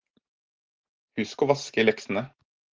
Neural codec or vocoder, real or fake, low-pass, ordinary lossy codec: none; real; 7.2 kHz; Opus, 16 kbps